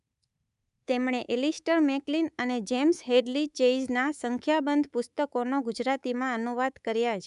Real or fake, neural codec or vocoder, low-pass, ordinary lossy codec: fake; codec, 24 kHz, 3.1 kbps, DualCodec; 10.8 kHz; none